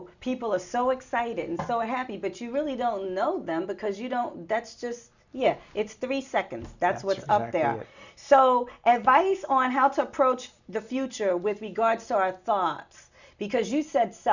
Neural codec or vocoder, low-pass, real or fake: none; 7.2 kHz; real